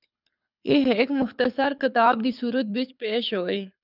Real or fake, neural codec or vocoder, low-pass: fake; codec, 24 kHz, 6 kbps, HILCodec; 5.4 kHz